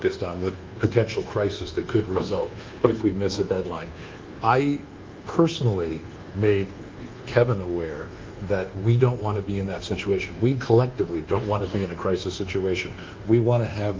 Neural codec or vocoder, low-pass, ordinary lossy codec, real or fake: codec, 24 kHz, 1.2 kbps, DualCodec; 7.2 kHz; Opus, 32 kbps; fake